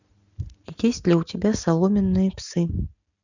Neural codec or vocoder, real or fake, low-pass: none; real; 7.2 kHz